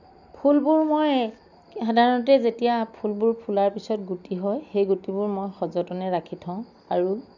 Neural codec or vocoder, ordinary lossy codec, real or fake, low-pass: none; none; real; 7.2 kHz